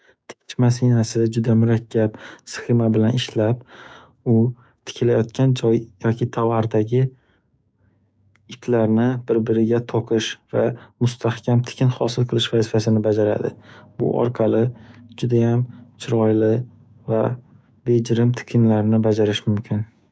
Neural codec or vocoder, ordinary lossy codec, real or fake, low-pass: codec, 16 kHz, 6 kbps, DAC; none; fake; none